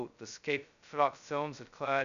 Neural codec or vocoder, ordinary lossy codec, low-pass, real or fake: codec, 16 kHz, 0.2 kbps, FocalCodec; MP3, 96 kbps; 7.2 kHz; fake